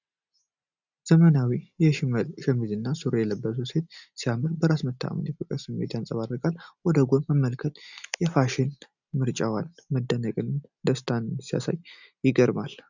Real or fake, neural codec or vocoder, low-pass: real; none; 7.2 kHz